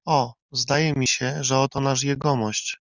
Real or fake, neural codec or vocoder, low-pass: real; none; 7.2 kHz